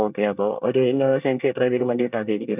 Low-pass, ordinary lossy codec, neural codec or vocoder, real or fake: 3.6 kHz; none; codec, 24 kHz, 1 kbps, SNAC; fake